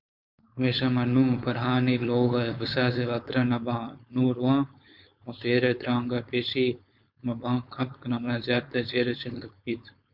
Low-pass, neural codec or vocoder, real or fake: 5.4 kHz; codec, 16 kHz, 4.8 kbps, FACodec; fake